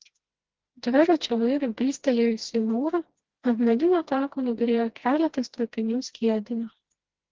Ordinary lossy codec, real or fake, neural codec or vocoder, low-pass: Opus, 16 kbps; fake; codec, 16 kHz, 1 kbps, FreqCodec, smaller model; 7.2 kHz